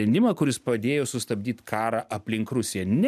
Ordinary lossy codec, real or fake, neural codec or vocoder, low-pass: MP3, 96 kbps; real; none; 14.4 kHz